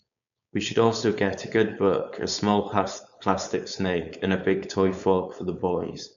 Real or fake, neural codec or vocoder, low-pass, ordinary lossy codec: fake; codec, 16 kHz, 4.8 kbps, FACodec; 7.2 kHz; none